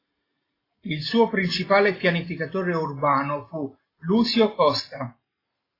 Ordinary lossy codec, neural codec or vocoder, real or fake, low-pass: AAC, 24 kbps; none; real; 5.4 kHz